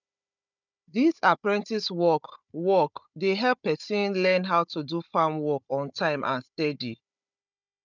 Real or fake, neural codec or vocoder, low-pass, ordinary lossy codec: fake; codec, 16 kHz, 16 kbps, FunCodec, trained on Chinese and English, 50 frames a second; 7.2 kHz; none